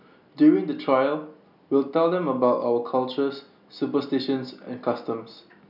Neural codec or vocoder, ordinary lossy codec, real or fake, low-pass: none; none; real; 5.4 kHz